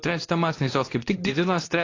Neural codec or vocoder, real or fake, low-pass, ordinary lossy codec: codec, 24 kHz, 0.9 kbps, WavTokenizer, medium speech release version 2; fake; 7.2 kHz; AAC, 32 kbps